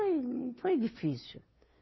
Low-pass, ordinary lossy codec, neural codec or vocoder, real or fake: 7.2 kHz; MP3, 24 kbps; codec, 16 kHz, 2 kbps, FunCodec, trained on Chinese and English, 25 frames a second; fake